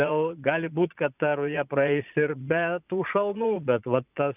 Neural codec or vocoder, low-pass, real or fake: vocoder, 22.05 kHz, 80 mel bands, Vocos; 3.6 kHz; fake